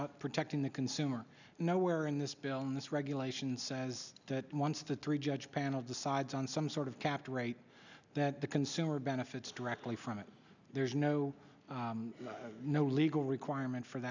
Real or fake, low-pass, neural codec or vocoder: real; 7.2 kHz; none